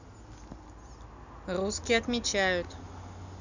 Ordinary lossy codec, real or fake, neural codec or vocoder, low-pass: none; real; none; 7.2 kHz